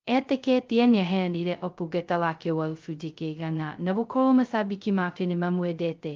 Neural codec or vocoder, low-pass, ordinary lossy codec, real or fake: codec, 16 kHz, 0.2 kbps, FocalCodec; 7.2 kHz; Opus, 24 kbps; fake